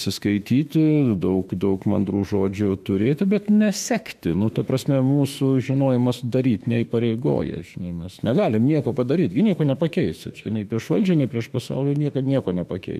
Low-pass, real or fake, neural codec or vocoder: 14.4 kHz; fake; autoencoder, 48 kHz, 32 numbers a frame, DAC-VAE, trained on Japanese speech